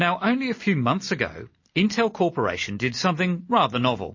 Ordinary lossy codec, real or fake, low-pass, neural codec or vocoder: MP3, 32 kbps; real; 7.2 kHz; none